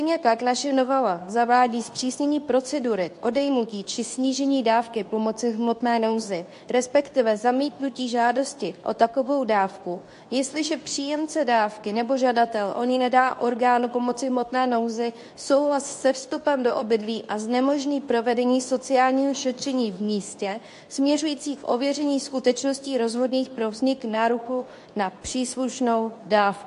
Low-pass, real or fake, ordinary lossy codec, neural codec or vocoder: 10.8 kHz; fake; AAC, 64 kbps; codec, 24 kHz, 0.9 kbps, WavTokenizer, medium speech release version 2